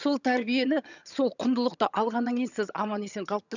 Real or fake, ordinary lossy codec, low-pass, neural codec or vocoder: fake; none; 7.2 kHz; vocoder, 22.05 kHz, 80 mel bands, HiFi-GAN